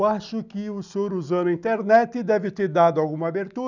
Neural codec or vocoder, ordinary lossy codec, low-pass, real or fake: none; none; 7.2 kHz; real